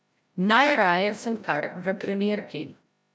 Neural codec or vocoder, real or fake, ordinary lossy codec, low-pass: codec, 16 kHz, 0.5 kbps, FreqCodec, larger model; fake; none; none